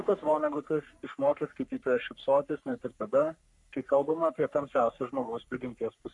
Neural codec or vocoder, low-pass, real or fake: codec, 44.1 kHz, 3.4 kbps, Pupu-Codec; 10.8 kHz; fake